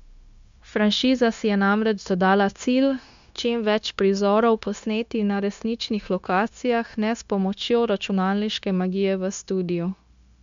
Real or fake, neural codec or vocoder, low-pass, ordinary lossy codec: fake; codec, 16 kHz, 0.9 kbps, LongCat-Audio-Codec; 7.2 kHz; MP3, 64 kbps